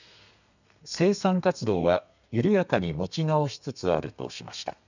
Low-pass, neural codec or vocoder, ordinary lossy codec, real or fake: 7.2 kHz; codec, 32 kHz, 1.9 kbps, SNAC; none; fake